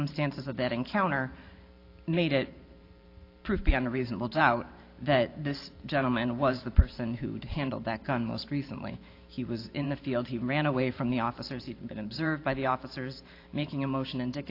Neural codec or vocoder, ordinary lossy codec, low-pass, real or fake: vocoder, 44.1 kHz, 128 mel bands every 512 samples, BigVGAN v2; AAC, 32 kbps; 5.4 kHz; fake